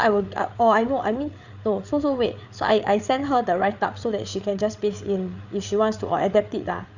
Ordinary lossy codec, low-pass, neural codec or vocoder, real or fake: none; 7.2 kHz; codec, 16 kHz, 8 kbps, FreqCodec, larger model; fake